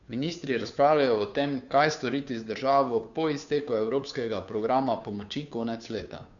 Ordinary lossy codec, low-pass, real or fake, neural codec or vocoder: none; 7.2 kHz; fake; codec, 16 kHz, 2 kbps, FunCodec, trained on Chinese and English, 25 frames a second